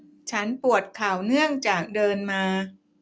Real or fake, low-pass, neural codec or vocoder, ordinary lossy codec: real; none; none; none